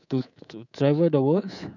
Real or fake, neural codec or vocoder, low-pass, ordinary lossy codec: fake; codec, 24 kHz, 3.1 kbps, DualCodec; 7.2 kHz; none